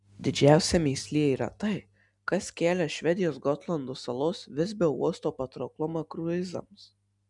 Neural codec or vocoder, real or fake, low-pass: none; real; 10.8 kHz